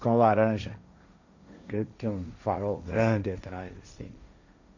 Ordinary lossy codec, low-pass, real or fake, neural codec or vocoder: none; 7.2 kHz; fake; codec, 16 kHz, 1.1 kbps, Voila-Tokenizer